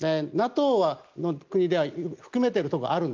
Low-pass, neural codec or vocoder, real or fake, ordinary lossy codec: 7.2 kHz; none; real; Opus, 32 kbps